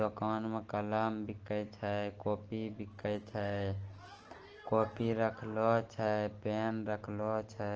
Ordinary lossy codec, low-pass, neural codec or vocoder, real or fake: Opus, 16 kbps; 7.2 kHz; none; real